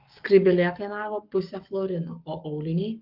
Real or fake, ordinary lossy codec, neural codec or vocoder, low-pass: fake; Opus, 32 kbps; codec, 44.1 kHz, 7.8 kbps, Pupu-Codec; 5.4 kHz